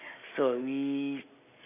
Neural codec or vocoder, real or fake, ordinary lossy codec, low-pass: none; real; none; 3.6 kHz